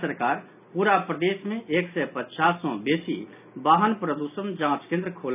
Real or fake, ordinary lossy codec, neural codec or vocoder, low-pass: real; none; none; 3.6 kHz